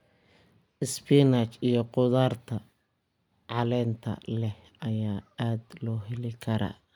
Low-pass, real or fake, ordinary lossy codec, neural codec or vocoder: 19.8 kHz; real; none; none